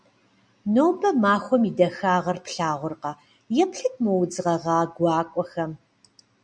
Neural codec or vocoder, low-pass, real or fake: none; 9.9 kHz; real